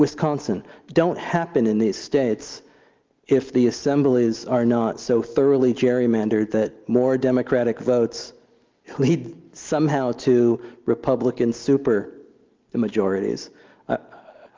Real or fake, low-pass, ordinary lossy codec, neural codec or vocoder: real; 7.2 kHz; Opus, 32 kbps; none